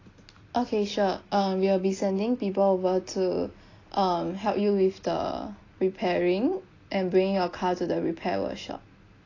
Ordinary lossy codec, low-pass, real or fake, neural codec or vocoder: AAC, 32 kbps; 7.2 kHz; real; none